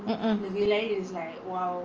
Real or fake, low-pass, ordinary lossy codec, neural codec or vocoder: real; 7.2 kHz; Opus, 24 kbps; none